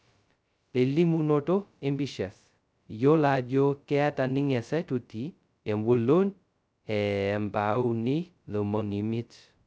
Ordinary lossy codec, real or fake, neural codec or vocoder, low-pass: none; fake; codec, 16 kHz, 0.2 kbps, FocalCodec; none